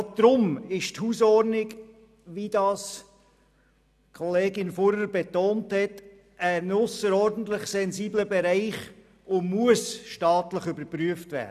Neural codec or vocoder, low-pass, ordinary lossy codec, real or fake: none; 14.4 kHz; none; real